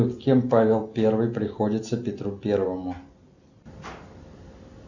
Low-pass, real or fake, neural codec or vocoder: 7.2 kHz; real; none